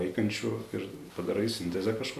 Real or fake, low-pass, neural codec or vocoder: real; 14.4 kHz; none